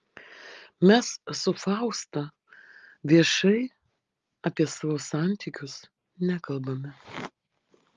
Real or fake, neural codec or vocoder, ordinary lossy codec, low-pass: real; none; Opus, 32 kbps; 7.2 kHz